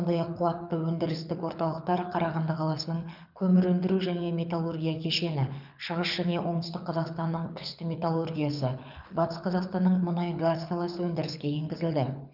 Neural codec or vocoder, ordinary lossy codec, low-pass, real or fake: codec, 24 kHz, 6 kbps, HILCodec; none; 5.4 kHz; fake